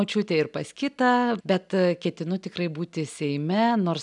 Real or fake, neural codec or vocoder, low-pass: real; none; 10.8 kHz